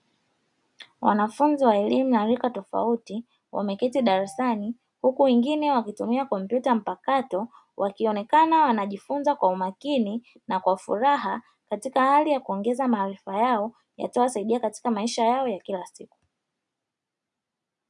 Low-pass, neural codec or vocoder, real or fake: 10.8 kHz; none; real